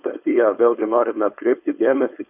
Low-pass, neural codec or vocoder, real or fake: 3.6 kHz; codec, 16 kHz, 4.8 kbps, FACodec; fake